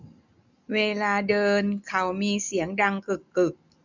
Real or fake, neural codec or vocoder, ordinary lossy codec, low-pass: real; none; none; 7.2 kHz